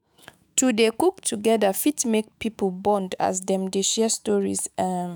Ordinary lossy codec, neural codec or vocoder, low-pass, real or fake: none; autoencoder, 48 kHz, 128 numbers a frame, DAC-VAE, trained on Japanese speech; none; fake